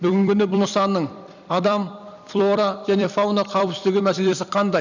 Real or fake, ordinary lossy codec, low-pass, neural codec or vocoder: fake; none; 7.2 kHz; vocoder, 44.1 kHz, 128 mel bands every 256 samples, BigVGAN v2